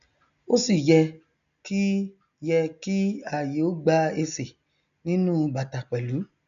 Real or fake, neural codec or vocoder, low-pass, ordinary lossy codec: real; none; 7.2 kHz; none